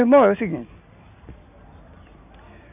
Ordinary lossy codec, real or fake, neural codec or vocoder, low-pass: none; real; none; 3.6 kHz